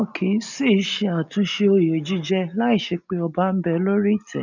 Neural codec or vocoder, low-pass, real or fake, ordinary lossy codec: none; 7.2 kHz; real; none